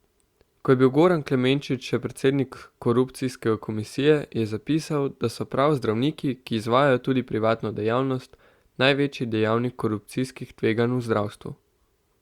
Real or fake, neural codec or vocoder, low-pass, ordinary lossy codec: real; none; 19.8 kHz; Opus, 64 kbps